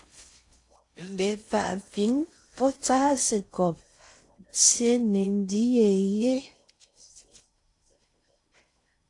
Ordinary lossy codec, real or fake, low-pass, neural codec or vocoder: AAC, 48 kbps; fake; 10.8 kHz; codec, 16 kHz in and 24 kHz out, 0.6 kbps, FocalCodec, streaming, 4096 codes